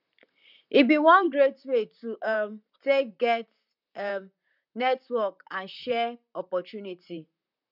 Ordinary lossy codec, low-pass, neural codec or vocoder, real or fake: none; 5.4 kHz; vocoder, 44.1 kHz, 128 mel bands, Pupu-Vocoder; fake